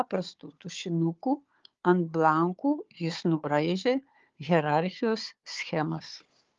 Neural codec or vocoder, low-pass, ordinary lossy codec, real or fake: codec, 16 kHz, 4 kbps, FunCodec, trained on Chinese and English, 50 frames a second; 7.2 kHz; Opus, 32 kbps; fake